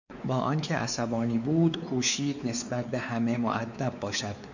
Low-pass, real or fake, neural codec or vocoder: 7.2 kHz; fake; codec, 16 kHz, 4 kbps, X-Codec, WavLM features, trained on Multilingual LibriSpeech